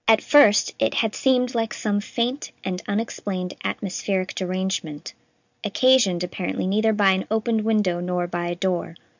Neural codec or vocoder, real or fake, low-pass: none; real; 7.2 kHz